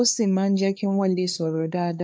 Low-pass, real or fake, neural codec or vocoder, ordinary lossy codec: none; fake; codec, 16 kHz, 2 kbps, X-Codec, HuBERT features, trained on LibriSpeech; none